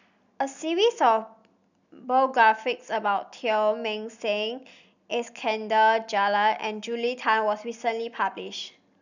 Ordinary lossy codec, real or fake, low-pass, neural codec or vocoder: none; real; 7.2 kHz; none